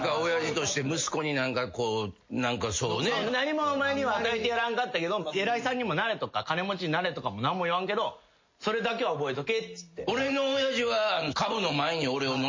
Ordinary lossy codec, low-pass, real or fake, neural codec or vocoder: MP3, 32 kbps; 7.2 kHz; real; none